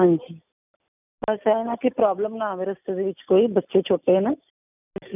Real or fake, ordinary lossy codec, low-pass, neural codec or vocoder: real; none; 3.6 kHz; none